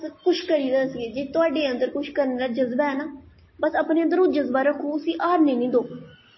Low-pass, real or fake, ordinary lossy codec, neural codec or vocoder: 7.2 kHz; real; MP3, 24 kbps; none